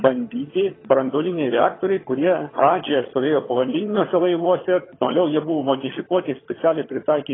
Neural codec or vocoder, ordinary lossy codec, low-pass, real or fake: vocoder, 22.05 kHz, 80 mel bands, HiFi-GAN; AAC, 16 kbps; 7.2 kHz; fake